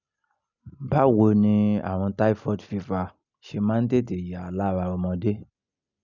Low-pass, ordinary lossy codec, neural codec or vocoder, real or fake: 7.2 kHz; none; none; real